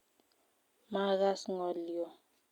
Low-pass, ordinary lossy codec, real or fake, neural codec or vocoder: 19.8 kHz; Opus, 64 kbps; real; none